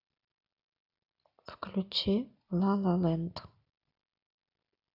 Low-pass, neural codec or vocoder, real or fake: 5.4 kHz; none; real